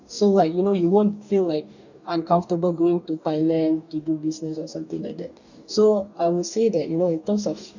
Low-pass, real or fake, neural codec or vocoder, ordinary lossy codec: 7.2 kHz; fake; codec, 44.1 kHz, 2.6 kbps, DAC; none